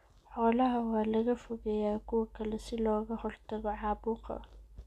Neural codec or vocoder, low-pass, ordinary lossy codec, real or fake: none; 14.4 kHz; none; real